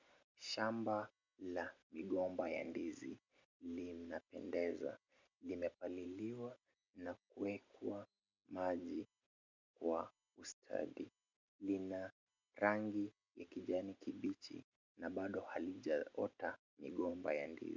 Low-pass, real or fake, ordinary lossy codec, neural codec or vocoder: 7.2 kHz; real; MP3, 64 kbps; none